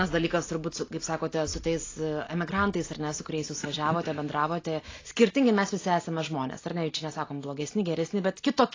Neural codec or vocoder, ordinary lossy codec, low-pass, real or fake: none; AAC, 32 kbps; 7.2 kHz; real